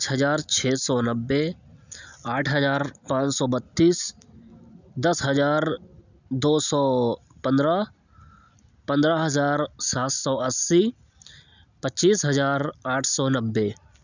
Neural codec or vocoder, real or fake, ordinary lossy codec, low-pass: none; real; none; 7.2 kHz